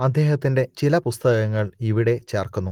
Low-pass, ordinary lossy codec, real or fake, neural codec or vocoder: 19.8 kHz; Opus, 24 kbps; real; none